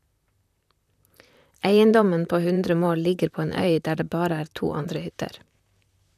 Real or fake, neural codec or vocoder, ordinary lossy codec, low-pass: fake; vocoder, 44.1 kHz, 128 mel bands, Pupu-Vocoder; none; 14.4 kHz